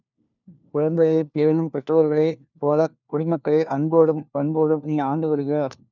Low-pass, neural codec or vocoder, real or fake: 7.2 kHz; codec, 16 kHz, 1 kbps, FunCodec, trained on LibriTTS, 50 frames a second; fake